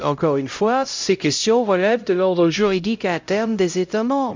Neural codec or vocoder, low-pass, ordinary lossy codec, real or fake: codec, 16 kHz, 0.5 kbps, X-Codec, HuBERT features, trained on LibriSpeech; 7.2 kHz; MP3, 64 kbps; fake